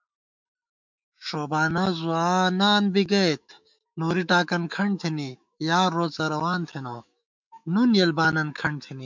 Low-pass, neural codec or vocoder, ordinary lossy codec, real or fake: 7.2 kHz; autoencoder, 48 kHz, 128 numbers a frame, DAC-VAE, trained on Japanese speech; MP3, 64 kbps; fake